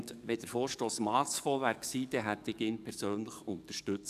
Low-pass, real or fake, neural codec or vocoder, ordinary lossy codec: 14.4 kHz; fake; codec, 44.1 kHz, 7.8 kbps, DAC; none